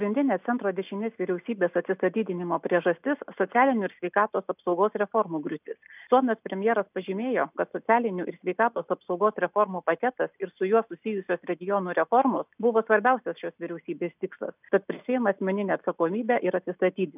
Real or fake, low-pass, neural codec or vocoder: real; 3.6 kHz; none